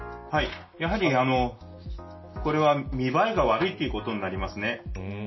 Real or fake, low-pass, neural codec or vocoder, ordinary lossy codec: real; 7.2 kHz; none; MP3, 24 kbps